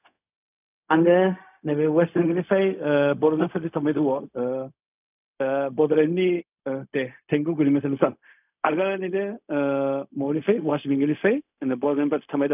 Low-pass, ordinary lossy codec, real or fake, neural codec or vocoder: 3.6 kHz; none; fake; codec, 16 kHz, 0.4 kbps, LongCat-Audio-Codec